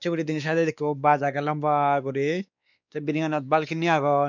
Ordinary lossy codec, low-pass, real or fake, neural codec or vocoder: none; 7.2 kHz; fake; codec, 16 kHz, 2 kbps, X-Codec, WavLM features, trained on Multilingual LibriSpeech